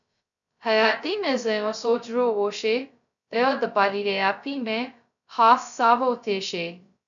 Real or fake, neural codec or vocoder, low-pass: fake; codec, 16 kHz, 0.2 kbps, FocalCodec; 7.2 kHz